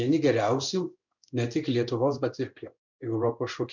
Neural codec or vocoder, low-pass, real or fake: codec, 16 kHz in and 24 kHz out, 1 kbps, XY-Tokenizer; 7.2 kHz; fake